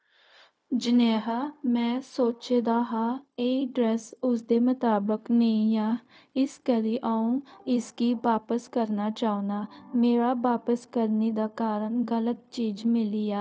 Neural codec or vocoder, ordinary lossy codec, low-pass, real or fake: codec, 16 kHz, 0.4 kbps, LongCat-Audio-Codec; none; none; fake